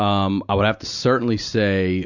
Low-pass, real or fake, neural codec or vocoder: 7.2 kHz; real; none